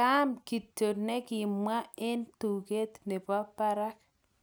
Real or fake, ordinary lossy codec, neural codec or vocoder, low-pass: real; none; none; none